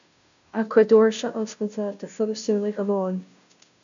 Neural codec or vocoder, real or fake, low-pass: codec, 16 kHz, 0.5 kbps, FunCodec, trained on Chinese and English, 25 frames a second; fake; 7.2 kHz